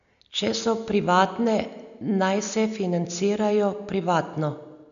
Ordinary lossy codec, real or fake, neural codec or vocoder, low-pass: none; real; none; 7.2 kHz